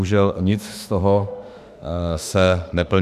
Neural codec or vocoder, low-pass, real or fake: autoencoder, 48 kHz, 32 numbers a frame, DAC-VAE, trained on Japanese speech; 14.4 kHz; fake